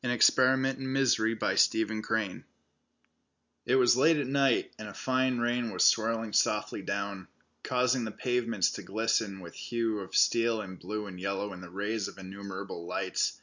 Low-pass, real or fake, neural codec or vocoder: 7.2 kHz; real; none